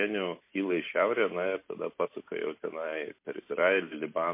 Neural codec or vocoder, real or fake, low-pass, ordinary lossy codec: none; real; 3.6 kHz; MP3, 24 kbps